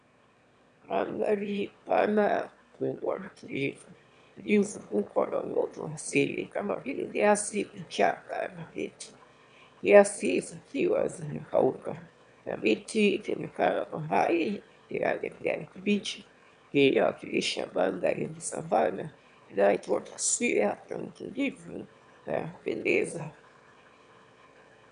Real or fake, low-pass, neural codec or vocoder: fake; 9.9 kHz; autoencoder, 22.05 kHz, a latent of 192 numbers a frame, VITS, trained on one speaker